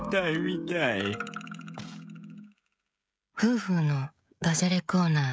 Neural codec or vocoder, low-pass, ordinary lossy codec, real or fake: codec, 16 kHz, 16 kbps, FreqCodec, smaller model; none; none; fake